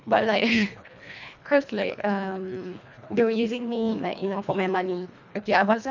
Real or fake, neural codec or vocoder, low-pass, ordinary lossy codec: fake; codec, 24 kHz, 1.5 kbps, HILCodec; 7.2 kHz; none